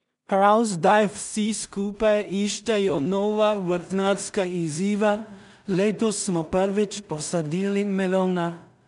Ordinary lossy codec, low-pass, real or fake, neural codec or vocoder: none; 10.8 kHz; fake; codec, 16 kHz in and 24 kHz out, 0.4 kbps, LongCat-Audio-Codec, two codebook decoder